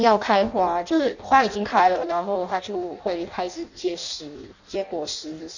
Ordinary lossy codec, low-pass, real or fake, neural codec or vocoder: none; 7.2 kHz; fake; codec, 16 kHz in and 24 kHz out, 0.6 kbps, FireRedTTS-2 codec